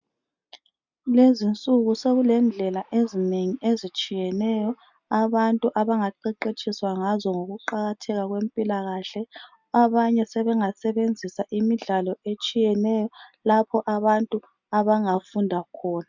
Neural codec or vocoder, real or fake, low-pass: none; real; 7.2 kHz